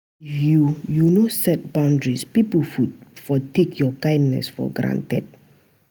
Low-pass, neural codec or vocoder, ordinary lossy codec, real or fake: none; none; none; real